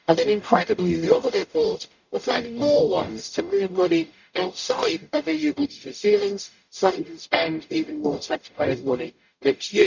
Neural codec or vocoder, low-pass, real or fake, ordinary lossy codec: codec, 44.1 kHz, 0.9 kbps, DAC; 7.2 kHz; fake; none